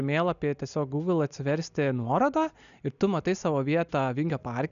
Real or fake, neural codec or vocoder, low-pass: real; none; 7.2 kHz